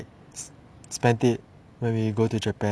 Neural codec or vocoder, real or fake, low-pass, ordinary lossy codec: none; real; none; none